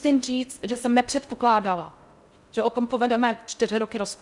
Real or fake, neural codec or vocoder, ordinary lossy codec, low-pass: fake; codec, 16 kHz in and 24 kHz out, 0.6 kbps, FocalCodec, streaming, 4096 codes; Opus, 64 kbps; 10.8 kHz